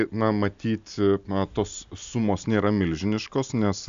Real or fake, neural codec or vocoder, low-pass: real; none; 7.2 kHz